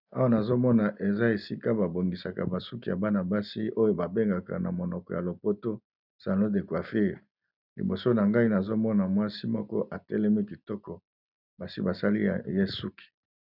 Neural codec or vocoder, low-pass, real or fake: none; 5.4 kHz; real